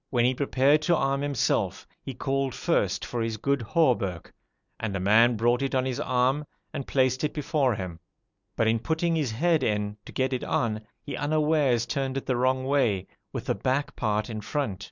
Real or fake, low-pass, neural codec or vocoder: real; 7.2 kHz; none